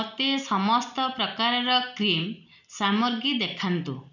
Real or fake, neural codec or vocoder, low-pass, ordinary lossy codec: real; none; 7.2 kHz; none